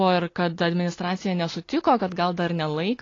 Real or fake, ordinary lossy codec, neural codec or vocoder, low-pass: real; AAC, 32 kbps; none; 7.2 kHz